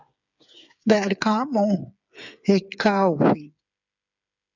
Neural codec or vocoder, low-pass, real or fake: codec, 16 kHz, 8 kbps, FreqCodec, smaller model; 7.2 kHz; fake